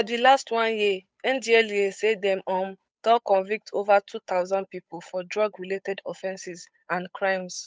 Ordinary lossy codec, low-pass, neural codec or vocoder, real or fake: none; none; codec, 16 kHz, 8 kbps, FunCodec, trained on Chinese and English, 25 frames a second; fake